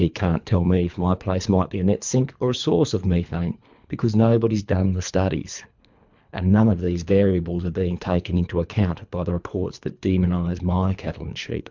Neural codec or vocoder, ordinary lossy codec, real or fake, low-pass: codec, 24 kHz, 3 kbps, HILCodec; MP3, 64 kbps; fake; 7.2 kHz